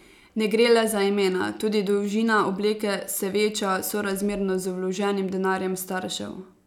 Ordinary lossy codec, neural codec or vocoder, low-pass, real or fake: none; none; 19.8 kHz; real